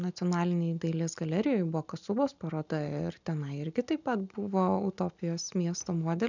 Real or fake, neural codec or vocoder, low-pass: real; none; 7.2 kHz